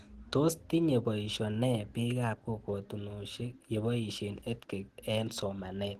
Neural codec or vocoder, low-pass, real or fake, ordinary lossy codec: none; 14.4 kHz; real; Opus, 16 kbps